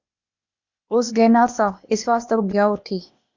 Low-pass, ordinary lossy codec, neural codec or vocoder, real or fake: 7.2 kHz; Opus, 64 kbps; codec, 16 kHz, 0.8 kbps, ZipCodec; fake